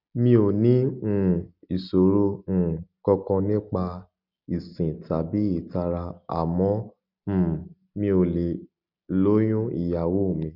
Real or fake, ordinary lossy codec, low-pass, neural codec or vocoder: real; none; 5.4 kHz; none